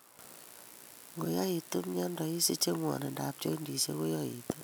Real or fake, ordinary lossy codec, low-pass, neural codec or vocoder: real; none; none; none